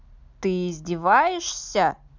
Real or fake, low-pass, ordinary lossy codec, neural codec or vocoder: real; 7.2 kHz; none; none